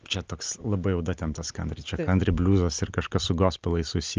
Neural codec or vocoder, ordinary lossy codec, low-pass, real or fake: none; Opus, 24 kbps; 7.2 kHz; real